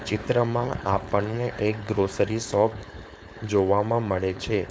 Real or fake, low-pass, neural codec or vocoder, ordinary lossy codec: fake; none; codec, 16 kHz, 4.8 kbps, FACodec; none